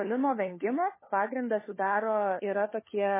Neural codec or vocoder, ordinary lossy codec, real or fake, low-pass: codec, 16 kHz, 4 kbps, FunCodec, trained on LibriTTS, 50 frames a second; MP3, 16 kbps; fake; 3.6 kHz